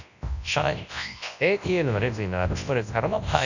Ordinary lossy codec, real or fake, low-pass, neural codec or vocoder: none; fake; 7.2 kHz; codec, 24 kHz, 0.9 kbps, WavTokenizer, large speech release